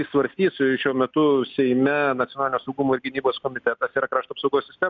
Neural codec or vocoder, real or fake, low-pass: none; real; 7.2 kHz